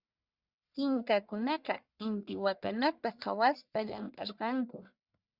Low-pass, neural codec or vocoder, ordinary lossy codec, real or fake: 5.4 kHz; codec, 44.1 kHz, 1.7 kbps, Pupu-Codec; Opus, 64 kbps; fake